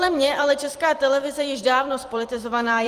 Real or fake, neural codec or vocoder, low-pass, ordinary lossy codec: fake; vocoder, 44.1 kHz, 128 mel bands every 256 samples, BigVGAN v2; 14.4 kHz; Opus, 24 kbps